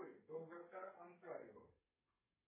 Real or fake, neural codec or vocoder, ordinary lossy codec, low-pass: fake; codec, 32 kHz, 1.9 kbps, SNAC; MP3, 16 kbps; 3.6 kHz